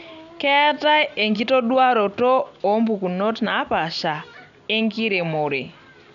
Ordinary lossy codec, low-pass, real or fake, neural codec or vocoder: MP3, 96 kbps; 7.2 kHz; real; none